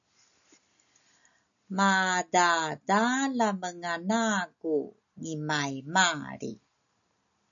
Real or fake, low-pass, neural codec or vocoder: real; 7.2 kHz; none